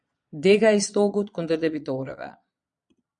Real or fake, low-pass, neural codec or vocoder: fake; 9.9 kHz; vocoder, 22.05 kHz, 80 mel bands, Vocos